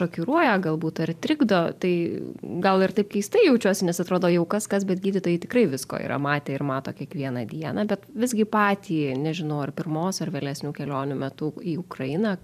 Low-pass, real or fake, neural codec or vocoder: 14.4 kHz; fake; vocoder, 44.1 kHz, 128 mel bands every 512 samples, BigVGAN v2